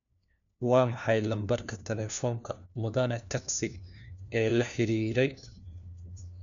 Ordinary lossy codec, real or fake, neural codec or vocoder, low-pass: none; fake; codec, 16 kHz, 1 kbps, FunCodec, trained on LibriTTS, 50 frames a second; 7.2 kHz